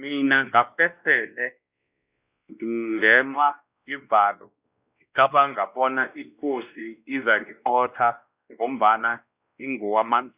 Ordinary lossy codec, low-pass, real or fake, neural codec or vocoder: Opus, 64 kbps; 3.6 kHz; fake; codec, 16 kHz, 1 kbps, X-Codec, WavLM features, trained on Multilingual LibriSpeech